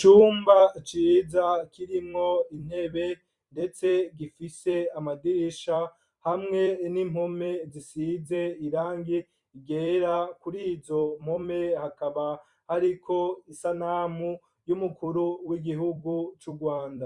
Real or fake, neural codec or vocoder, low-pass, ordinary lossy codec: fake; vocoder, 44.1 kHz, 128 mel bands every 256 samples, BigVGAN v2; 10.8 kHz; Opus, 64 kbps